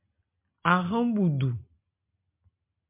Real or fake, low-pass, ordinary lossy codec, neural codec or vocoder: real; 3.6 kHz; MP3, 32 kbps; none